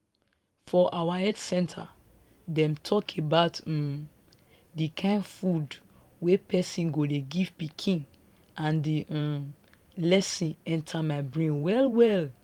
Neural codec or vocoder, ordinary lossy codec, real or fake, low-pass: vocoder, 44.1 kHz, 128 mel bands every 512 samples, BigVGAN v2; Opus, 32 kbps; fake; 19.8 kHz